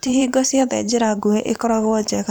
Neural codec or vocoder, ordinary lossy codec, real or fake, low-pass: vocoder, 44.1 kHz, 128 mel bands every 512 samples, BigVGAN v2; none; fake; none